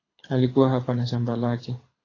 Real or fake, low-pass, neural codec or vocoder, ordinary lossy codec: fake; 7.2 kHz; codec, 24 kHz, 6 kbps, HILCodec; AAC, 32 kbps